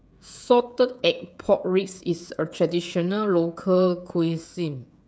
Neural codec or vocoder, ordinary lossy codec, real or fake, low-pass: codec, 16 kHz, 16 kbps, FreqCodec, smaller model; none; fake; none